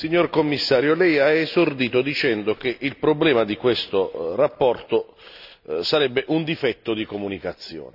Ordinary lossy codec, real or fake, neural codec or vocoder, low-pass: none; real; none; 5.4 kHz